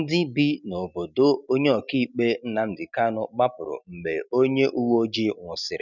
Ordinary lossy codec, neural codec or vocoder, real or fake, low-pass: none; none; real; 7.2 kHz